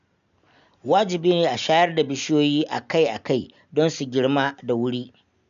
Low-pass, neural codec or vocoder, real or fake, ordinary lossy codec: 7.2 kHz; none; real; none